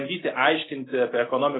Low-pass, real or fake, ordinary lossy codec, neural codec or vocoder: 7.2 kHz; real; AAC, 16 kbps; none